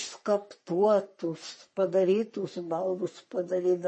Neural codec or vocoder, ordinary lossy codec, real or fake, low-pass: codec, 44.1 kHz, 3.4 kbps, Pupu-Codec; MP3, 32 kbps; fake; 10.8 kHz